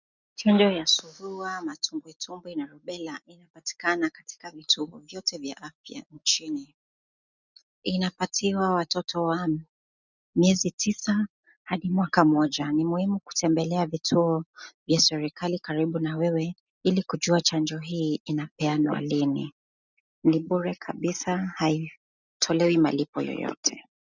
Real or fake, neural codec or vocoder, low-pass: real; none; 7.2 kHz